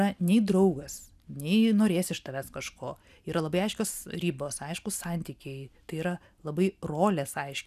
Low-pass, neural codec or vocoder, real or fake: 14.4 kHz; none; real